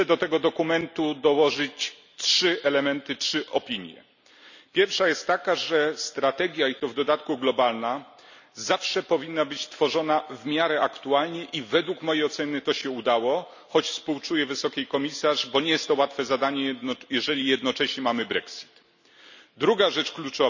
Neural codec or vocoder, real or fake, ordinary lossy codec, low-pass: none; real; none; 7.2 kHz